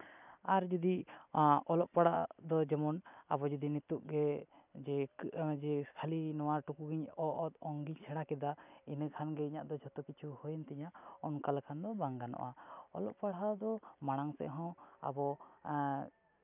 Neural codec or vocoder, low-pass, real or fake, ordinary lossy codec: none; 3.6 kHz; real; none